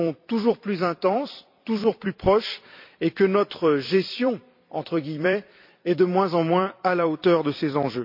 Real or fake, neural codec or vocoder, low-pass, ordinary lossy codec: fake; vocoder, 44.1 kHz, 128 mel bands every 256 samples, BigVGAN v2; 5.4 kHz; MP3, 48 kbps